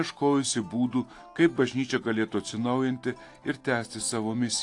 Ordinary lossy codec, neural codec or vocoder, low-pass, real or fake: AAC, 48 kbps; none; 10.8 kHz; real